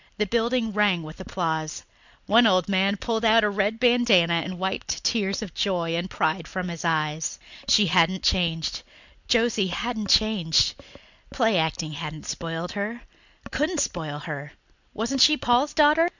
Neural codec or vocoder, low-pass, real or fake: none; 7.2 kHz; real